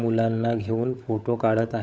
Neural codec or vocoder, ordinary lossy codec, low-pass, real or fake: codec, 16 kHz, 16 kbps, FunCodec, trained on LibriTTS, 50 frames a second; none; none; fake